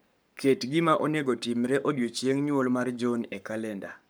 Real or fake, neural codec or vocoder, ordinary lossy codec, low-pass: fake; codec, 44.1 kHz, 7.8 kbps, Pupu-Codec; none; none